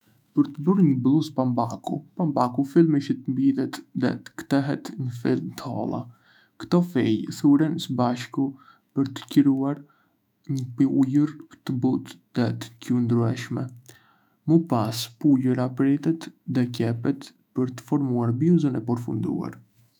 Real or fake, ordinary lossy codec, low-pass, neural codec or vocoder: fake; none; 19.8 kHz; autoencoder, 48 kHz, 128 numbers a frame, DAC-VAE, trained on Japanese speech